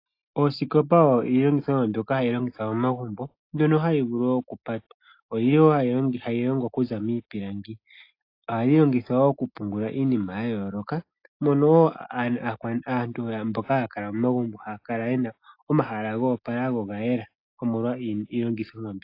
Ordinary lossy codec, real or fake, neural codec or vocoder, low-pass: AAC, 32 kbps; real; none; 5.4 kHz